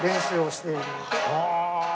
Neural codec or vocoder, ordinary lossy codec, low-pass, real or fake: none; none; none; real